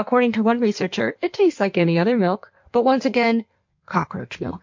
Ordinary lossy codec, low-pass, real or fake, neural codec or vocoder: MP3, 48 kbps; 7.2 kHz; fake; codec, 16 kHz in and 24 kHz out, 1.1 kbps, FireRedTTS-2 codec